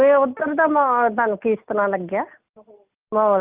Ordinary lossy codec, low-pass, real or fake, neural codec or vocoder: Opus, 24 kbps; 3.6 kHz; real; none